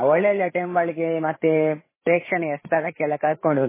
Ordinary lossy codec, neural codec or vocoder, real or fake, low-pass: MP3, 16 kbps; codec, 16 kHz, 8 kbps, FreqCodec, larger model; fake; 3.6 kHz